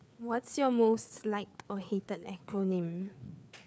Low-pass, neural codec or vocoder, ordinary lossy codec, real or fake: none; codec, 16 kHz, 4 kbps, FreqCodec, larger model; none; fake